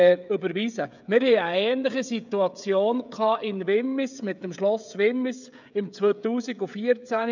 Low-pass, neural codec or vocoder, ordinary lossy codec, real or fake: 7.2 kHz; codec, 16 kHz, 16 kbps, FreqCodec, smaller model; none; fake